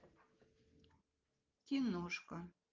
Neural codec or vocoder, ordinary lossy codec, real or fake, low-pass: none; Opus, 32 kbps; real; 7.2 kHz